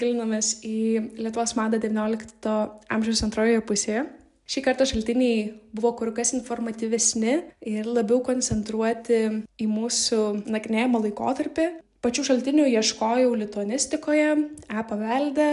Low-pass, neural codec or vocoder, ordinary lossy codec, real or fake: 10.8 kHz; none; MP3, 96 kbps; real